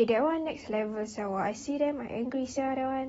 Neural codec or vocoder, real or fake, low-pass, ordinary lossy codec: none; real; 19.8 kHz; AAC, 24 kbps